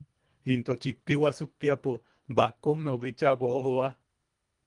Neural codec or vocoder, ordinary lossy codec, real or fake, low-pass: codec, 24 kHz, 1.5 kbps, HILCodec; Opus, 32 kbps; fake; 10.8 kHz